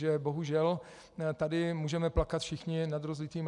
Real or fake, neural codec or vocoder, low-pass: real; none; 10.8 kHz